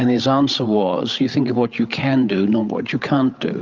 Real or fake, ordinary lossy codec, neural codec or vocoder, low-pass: fake; Opus, 32 kbps; codec, 16 kHz, 8 kbps, FreqCodec, larger model; 7.2 kHz